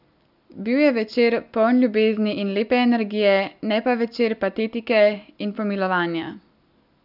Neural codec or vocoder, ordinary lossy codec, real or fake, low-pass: none; none; real; 5.4 kHz